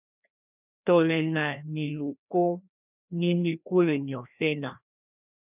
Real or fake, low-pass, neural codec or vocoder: fake; 3.6 kHz; codec, 16 kHz, 1 kbps, FreqCodec, larger model